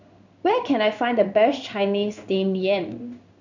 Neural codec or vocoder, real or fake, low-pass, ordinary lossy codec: codec, 16 kHz in and 24 kHz out, 1 kbps, XY-Tokenizer; fake; 7.2 kHz; none